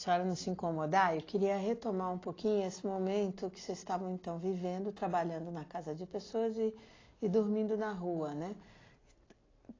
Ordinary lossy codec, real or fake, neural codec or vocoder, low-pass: AAC, 32 kbps; real; none; 7.2 kHz